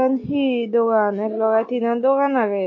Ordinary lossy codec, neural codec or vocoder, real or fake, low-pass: MP3, 32 kbps; none; real; 7.2 kHz